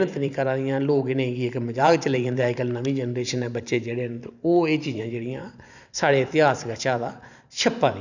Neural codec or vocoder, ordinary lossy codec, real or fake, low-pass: vocoder, 44.1 kHz, 128 mel bands every 512 samples, BigVGAN v2; none; fake; 7.2 kHz